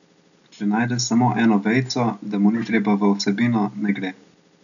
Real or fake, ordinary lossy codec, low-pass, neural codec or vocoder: real; none; 7.2 kHz; none